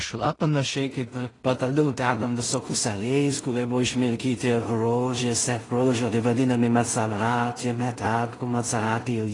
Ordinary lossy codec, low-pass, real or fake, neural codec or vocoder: AAC, 32 kbps; 10.8 kHz; fake; codec, 16 kHz in and 24 kHz out, 0.4 kbps, LongCat-Audio-Codec, two codebook decoder